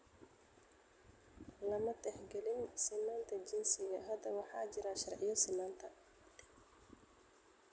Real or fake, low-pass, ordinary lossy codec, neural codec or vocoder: real; none; none; none